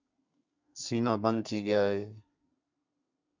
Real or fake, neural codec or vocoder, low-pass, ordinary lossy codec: fake; codec, 32 kHz, 1.9 kbps, SNAC; 7.2 kHz; MP3, 64 kbps